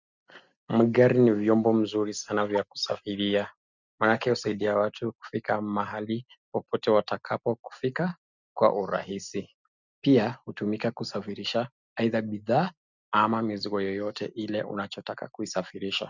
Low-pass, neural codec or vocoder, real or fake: 7.2 kHz; none; real